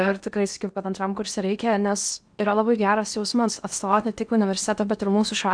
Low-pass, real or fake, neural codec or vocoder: 9.9 kHz; fake; codec, 16 kHz in and 24 kHz out, 0.8 kbps, FocalCodec, streaming, 65536 codes